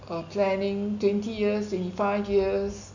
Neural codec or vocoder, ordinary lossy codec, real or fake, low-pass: none; none; real; 7.2 kHz